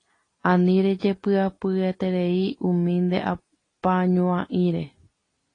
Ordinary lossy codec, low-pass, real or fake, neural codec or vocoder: AAC, 32 kbps; 9.9 kHz; real; none